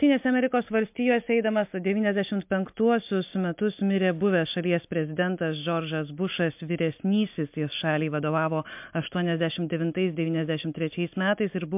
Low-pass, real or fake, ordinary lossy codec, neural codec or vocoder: 3.6 kHz; real; MP3, 32 kbps; none